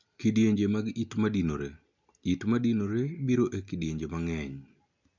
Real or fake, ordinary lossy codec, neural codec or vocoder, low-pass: real; none; none; 7.2 kHz